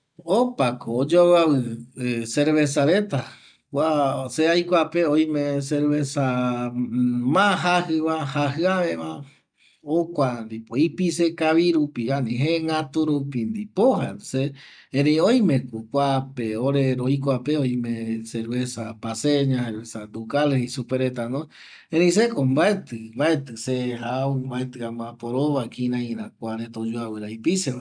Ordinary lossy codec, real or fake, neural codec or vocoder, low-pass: none; real; none; 9.9 kHz